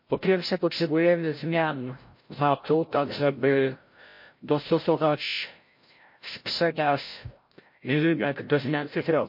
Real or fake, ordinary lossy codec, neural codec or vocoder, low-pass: fake; MP3, 32 kbps; codec, 16 kHz, 0.5 kbps, FreqCodec, larger model; 5.4 kHz